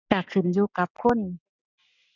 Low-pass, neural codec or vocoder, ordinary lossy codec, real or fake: 7.2 kHz; none; none; real